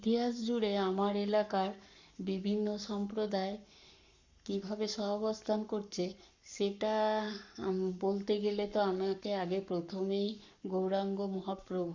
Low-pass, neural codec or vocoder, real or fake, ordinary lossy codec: 7.2 kHz; codec, 44.1 kHz, 7.8 kbps, Pupu-Codec; fake; Opus, 64 kbps